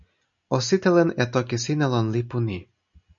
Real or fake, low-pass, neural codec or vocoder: real; 7.2 kHz; none